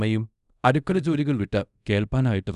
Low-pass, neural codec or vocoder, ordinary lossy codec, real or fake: 10.8 kHz; codec, 24 kHz, 0.5 kbps, DualCodec; none; fake